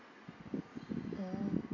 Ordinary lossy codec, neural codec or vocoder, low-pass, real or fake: none; none; 7.2 kHz; real